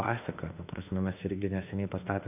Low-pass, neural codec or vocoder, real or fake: 3.6 kHz; autoencoder, 48 kHz, 32 numbers a frame, DAC-VAE, trained on Japanese speech; fake